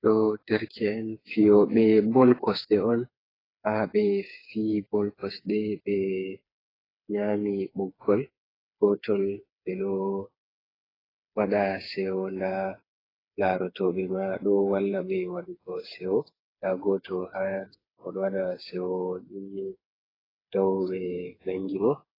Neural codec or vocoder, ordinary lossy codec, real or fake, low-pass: codec, 16 kHz, 4 kbps, FreqCodec, smaller model; AAC, 24 kbps; fake; 5.4 kHz